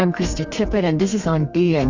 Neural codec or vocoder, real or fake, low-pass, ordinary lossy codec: codec, 32 kHz, 1.9 kbps, SNAC; fake; 7.2 kHz; Opus, 64 kbps